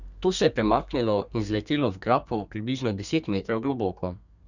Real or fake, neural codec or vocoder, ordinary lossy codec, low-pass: fake; codec, 32 kHz, 1.9 kbps, SNAC; none; 7.2 kHz